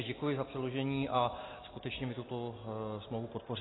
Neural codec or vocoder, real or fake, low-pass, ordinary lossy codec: none; real; 7.2 kHz; AAC, 16 kbps